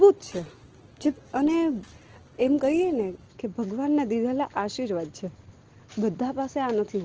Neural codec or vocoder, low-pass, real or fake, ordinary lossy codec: none; 7.2 kHz; real; Opus, 16 kbps